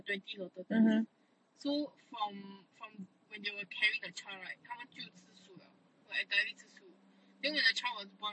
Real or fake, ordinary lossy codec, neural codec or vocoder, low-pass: real; MP3, 32 kbps; none; 9.9 kHz